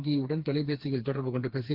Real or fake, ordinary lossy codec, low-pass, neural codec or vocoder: fake; Opus, 16 kbps; 5.4 kHz; codec, 16 kHz, 4 kbps, FreqCodec, smaller model